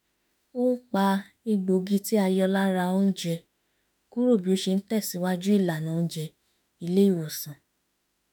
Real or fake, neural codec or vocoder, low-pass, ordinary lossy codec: fake; autoencoder, 48 kHz, 32 numbers a frame, DAC-VAE, trained on Japanese speech; none; none